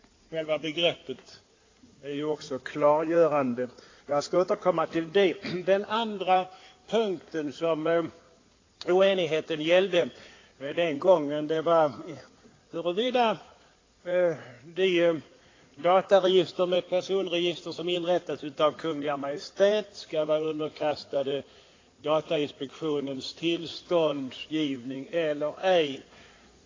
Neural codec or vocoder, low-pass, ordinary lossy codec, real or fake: codec, 16 kHz in and 24 kHz out, 2.2 kbps, FireRedTTS-2 codec; 7.2 kHz; AAC, 32 kbps; fake